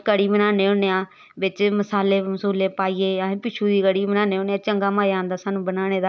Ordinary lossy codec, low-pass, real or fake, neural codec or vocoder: none; none; real; none